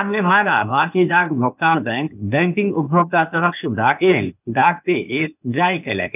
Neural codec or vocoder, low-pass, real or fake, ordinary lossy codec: codec, 16 kHz, 0.8 kbps, ZipCodec; 3.6 kHz; fake; none